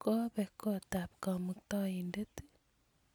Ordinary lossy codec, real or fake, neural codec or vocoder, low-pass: none; real; none; none